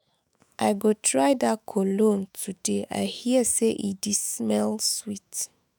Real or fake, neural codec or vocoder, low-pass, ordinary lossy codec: fake; autoencoder, 48 kHz, 128 numbers a frame, DAC-VAE, trained on Japanese speech; none; none